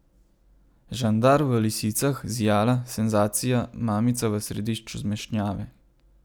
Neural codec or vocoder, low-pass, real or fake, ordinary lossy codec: none; none; real; none